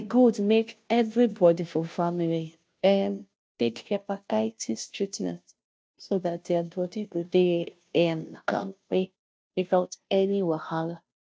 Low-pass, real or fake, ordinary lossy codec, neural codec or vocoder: none; fake; none; codec, 16 kHz, 0.5 kbps, FunCodec, trained on Chinese and English, 25 frames a second